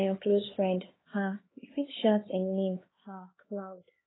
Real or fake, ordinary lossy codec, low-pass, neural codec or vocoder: fake; AAC, 16 kbps; 7.2 kHz; codec, 16 kHz, 2 kbps, X-Codec, HuBERT features, trained on LibriSpeech